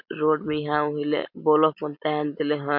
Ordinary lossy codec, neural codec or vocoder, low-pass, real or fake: MP3, 48 kbps; none; 5.4 kHz; real